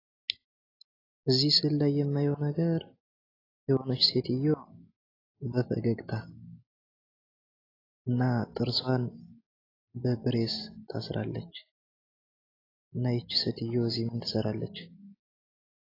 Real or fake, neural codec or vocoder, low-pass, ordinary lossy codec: real; none; 5.4 kHz; AAC, 24 kbps